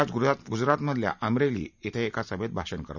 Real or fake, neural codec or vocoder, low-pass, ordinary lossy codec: real; none; 7.2 kHz; none